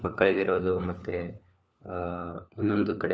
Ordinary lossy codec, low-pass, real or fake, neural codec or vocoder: none; none; fake; codec, 16 kHz, 4 kbps, FunCodec, trained on LibriTTS, 50 frames a second